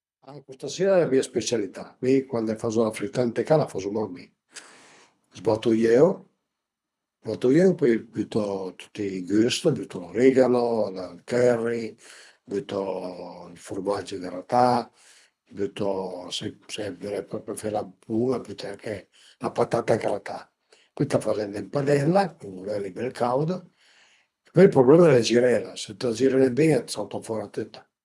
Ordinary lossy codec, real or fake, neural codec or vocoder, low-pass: none; fake; codec, 24 kHz, 3 kbps, HILCodec; none